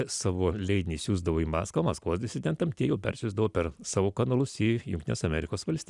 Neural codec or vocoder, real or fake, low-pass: none; real; 10.8 kHz